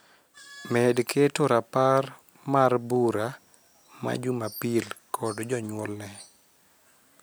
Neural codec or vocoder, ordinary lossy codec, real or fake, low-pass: none; none; real; none